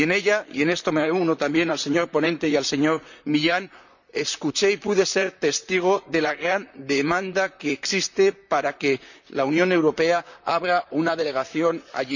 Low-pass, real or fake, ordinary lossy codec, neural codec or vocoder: 7.2 kHz; fake; none; vocoder, 44.1 kHz, 128 mel bands, Pupu-Vocoder